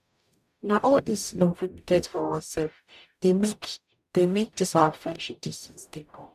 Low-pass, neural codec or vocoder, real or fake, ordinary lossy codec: 14.4 kHz; codec, 44.1 kHz, 0.9 kbps, DAC; fake; none